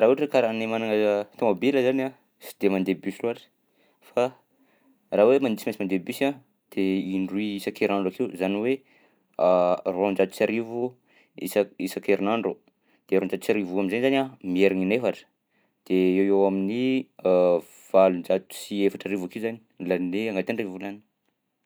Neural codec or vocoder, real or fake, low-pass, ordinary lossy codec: none; real; none; none